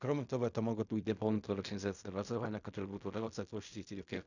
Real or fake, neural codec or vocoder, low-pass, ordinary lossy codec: fake; codec, 16 kHz in and 24 kHz out, 0.4 kbps, LongCat-Audio-Codec, fine tuned four codebook decoder; 7.2 kHz; none